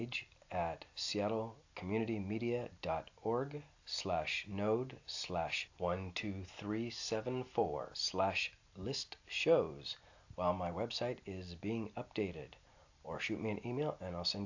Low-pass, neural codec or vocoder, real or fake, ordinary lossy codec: 7.2 kHz; none; real; AAC, 48 kbps